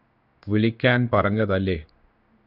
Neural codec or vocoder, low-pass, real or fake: codec, 16 kHz in and 24 kHz out, 1 kbps, XY-Tokenizer; 5.4 kHz; fake